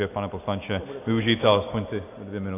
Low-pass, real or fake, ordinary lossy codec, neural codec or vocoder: 3.6 kHz; real; AAC, 24 kbps; none